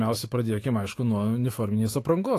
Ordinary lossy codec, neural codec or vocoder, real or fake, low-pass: AAC, 48 kbps; autoencoder, 48 kHz, 128 numbers a frame, DAC-VAE, trained on Japanese speech; fake; 14.4 kHz